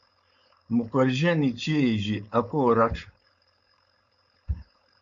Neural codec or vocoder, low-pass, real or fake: codec, 16 kHz, 4.8 kbps, FACodec; 7.2 kHz; fake